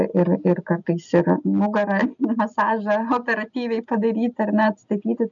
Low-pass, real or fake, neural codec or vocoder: 7.2 kHz; real; none